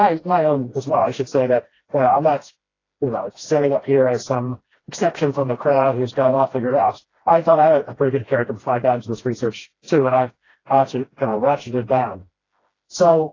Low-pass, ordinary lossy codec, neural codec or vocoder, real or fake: 7.2 kHz; AAC, 32 kbps; codec, 16 kHz, 1 kbps, FreqCodec, smaller model; fake